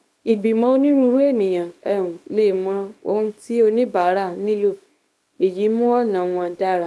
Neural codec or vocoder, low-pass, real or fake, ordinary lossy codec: codec, 24 kHz, 0.9 kbps, WavTokenizer, small release; none; fake; none